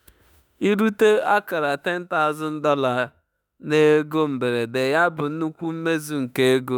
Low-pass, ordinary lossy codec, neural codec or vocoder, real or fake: 19.8 kHz; none; autoencoder, 48 kHz, 32 numbers a frame, DAC-VAE, trained on Japanese speech; fake